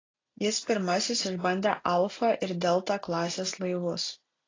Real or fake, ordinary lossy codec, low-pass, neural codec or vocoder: real; AAC, 32 kbps; 7.2 kHz; none